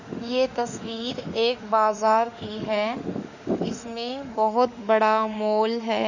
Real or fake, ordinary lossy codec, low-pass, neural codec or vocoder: fake; none; 7.2 kHz; autoencoder, 48 kHz, 32 numbers a frame, DAC-VAE, trained on Japanese speech